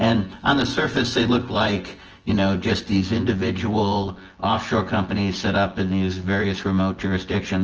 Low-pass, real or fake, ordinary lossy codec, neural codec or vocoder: 7.2 kHz; fake; Opus, 16 kbps; vocoder, 24 kHz, 100 mel bands, Vocos